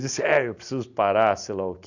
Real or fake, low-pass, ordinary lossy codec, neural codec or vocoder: real; 7.2 kHz; none; none